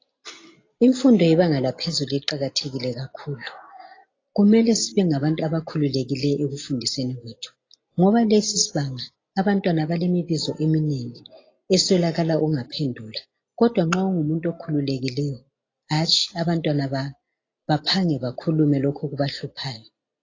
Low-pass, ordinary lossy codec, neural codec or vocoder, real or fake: 7.2 kHz; AAC, 32 kbps; none; real